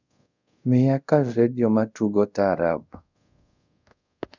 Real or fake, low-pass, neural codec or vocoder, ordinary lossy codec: fake; 7.2 kHz; codec, 24 kHz, 0.5 kbps, DualCodec; none